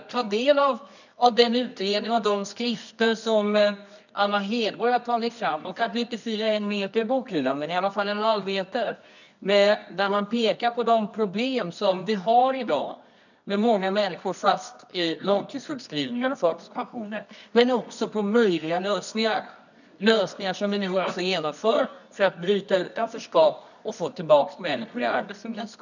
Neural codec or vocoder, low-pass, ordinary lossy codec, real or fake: codec, 24 kHz, 0.9 kbps, WavTokenizer, medium music audio release; 7.2 kHz; none; fake